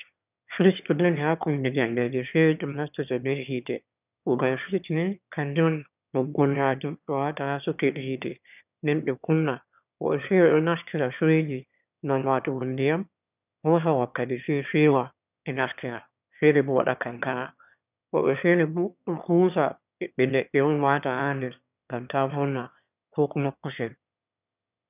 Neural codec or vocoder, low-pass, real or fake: autoencoder, 22.05 kHz, a latent of 192 numbers a frame, VITS, trained on one speaker; 3.6 kHz; fake